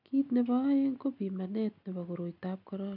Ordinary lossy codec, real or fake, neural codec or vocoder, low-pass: none; real; none; 5.4 kHz